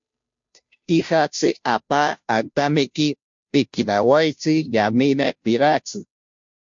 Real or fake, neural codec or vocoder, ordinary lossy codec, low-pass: fake; codec, 16 kHz, 0.5 kbps, FunCodec, trained on Chinese and English, 25 frames a second; MP3, 48 kbps; 7.2 kHz